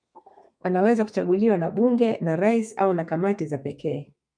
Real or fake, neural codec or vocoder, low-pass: fake; codec, 32 kHz, 1.9 kbps, SNAC; 9.9 kHz